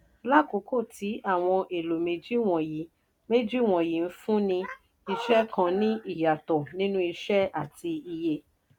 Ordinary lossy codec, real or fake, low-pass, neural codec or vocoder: none; real; 19.8 kHz; none